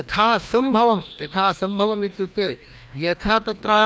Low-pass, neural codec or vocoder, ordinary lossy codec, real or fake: none; codec, 16 kHz, 1 kbps, FreqCodec, larger model; none; fake